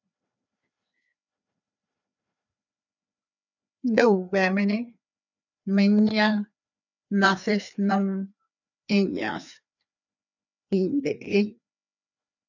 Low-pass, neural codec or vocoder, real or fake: 7.2 kHz; codec, 16 kHz, 2 kbps, FreqCodec, larger model; fake